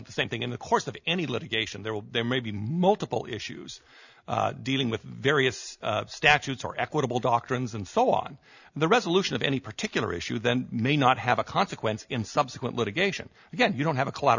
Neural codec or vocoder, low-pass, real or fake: none; 7.2 kHz; real